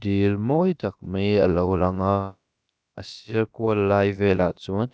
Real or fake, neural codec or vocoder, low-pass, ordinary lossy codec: fake; codec, 16 kHz, about 1 kbps, DyCAST, with the encoder's durations; none; none